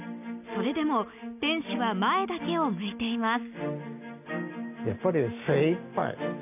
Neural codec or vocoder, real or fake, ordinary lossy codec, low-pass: none; real; none; 3.6 kHz